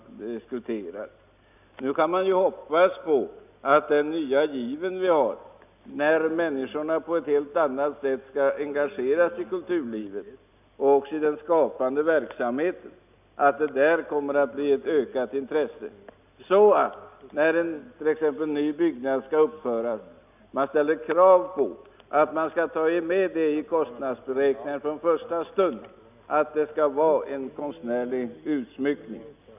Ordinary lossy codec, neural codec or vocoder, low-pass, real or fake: none; none; 3.6 kHz; real